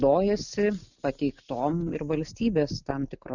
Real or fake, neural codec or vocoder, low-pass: real; none; 7.2 kHz